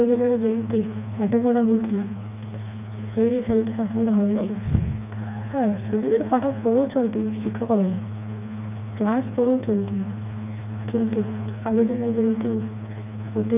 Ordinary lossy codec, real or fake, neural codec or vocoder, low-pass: none; fake; codec, 16 kHz, 2 kbps, FreqCodec, smaller model; 3.6 kHz